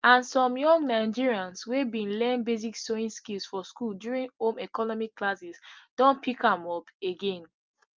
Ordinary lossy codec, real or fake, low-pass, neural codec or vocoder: Opus, 24 kbps; real; 7.2 kHz; none